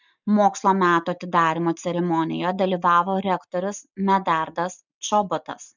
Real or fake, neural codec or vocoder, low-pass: real; none; 7.2 kHz